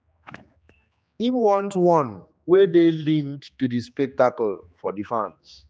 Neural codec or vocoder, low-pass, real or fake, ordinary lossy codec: codec, 16 kHz, 2 kbps, X-Codec, HuBERT features, trained on general audio; none; fake; none